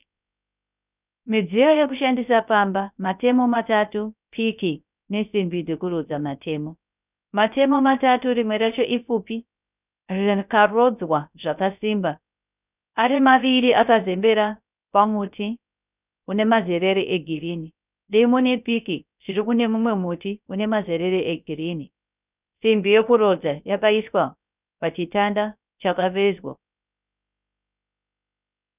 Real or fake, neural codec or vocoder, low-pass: fake; codec, 16 kHz, 0.3 kbps, FocalCodec; 3.6 kHz